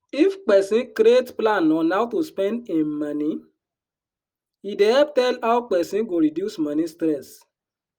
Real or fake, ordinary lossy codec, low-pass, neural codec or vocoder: real; Opus, 32 kbps; 19.8 kHz; none